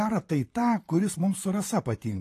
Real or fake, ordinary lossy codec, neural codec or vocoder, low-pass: fake; AAC, 48 kbps; vocoder, 44.1 kHz, 128 mel bands, Pupu-Vocoder; 14.4 kHz